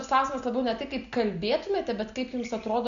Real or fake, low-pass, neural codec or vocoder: real; 7.2 kHz; none